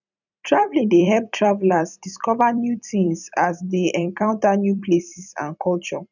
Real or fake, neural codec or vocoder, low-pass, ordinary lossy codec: fake; vocoder, 44.1 kHz, 128 mel bands every 256 samples, BigVGAN v2; 7.2 kHz; none